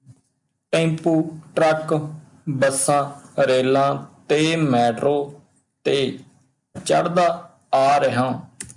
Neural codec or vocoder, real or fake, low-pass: none; real; 10.8 kHz